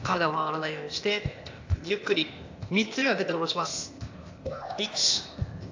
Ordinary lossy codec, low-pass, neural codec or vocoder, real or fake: AAC, 48 kbps; 7.2 kHz; codec, 16 kHz, 0.8 kbps, ZipCodec; fake